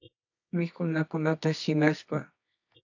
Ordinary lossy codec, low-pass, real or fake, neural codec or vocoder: AAC, 48 kbps; 7.2 kHz; fake; codec, 24 kHz, 0.9 kbps, WavTokenizer, medium music audio release